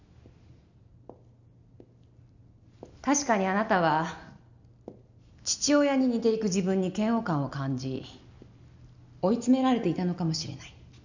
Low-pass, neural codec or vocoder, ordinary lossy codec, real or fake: 7.2 kHz; none; none; real